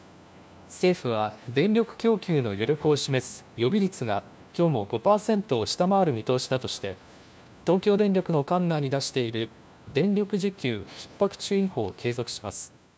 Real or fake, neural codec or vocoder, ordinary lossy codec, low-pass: fake; codec, 16 kHz, 1 kbps, FunCodec, trained on LibriTTS, 50 frames a second; none; none